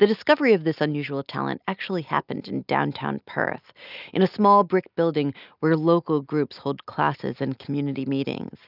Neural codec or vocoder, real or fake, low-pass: none; real; 5.4 kHz